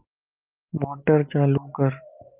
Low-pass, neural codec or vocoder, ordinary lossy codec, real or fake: 3.6 kHz; none; Opus, 24 kbps; real